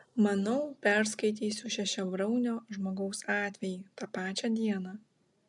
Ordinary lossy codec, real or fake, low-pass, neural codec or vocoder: MP3, 96 kbps; real; 10.8 kHz; none